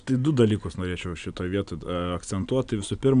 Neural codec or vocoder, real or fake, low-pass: none; real; 9.9 kHz